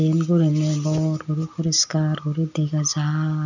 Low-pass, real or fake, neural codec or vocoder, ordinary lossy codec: 7.2 kHz; real; none; none